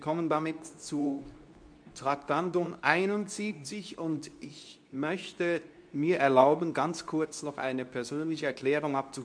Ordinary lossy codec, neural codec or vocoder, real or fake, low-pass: MP3, 64 kbps; codec, 24 kHz, 0.9 kbps, WavTokenizer, medium speech release version 2; fake; 9.9 kHz